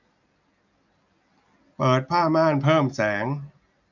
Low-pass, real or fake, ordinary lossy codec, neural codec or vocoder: 7.2 kHz; real; none; none